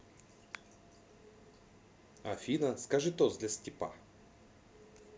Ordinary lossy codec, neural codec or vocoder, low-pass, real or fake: none; none; none; real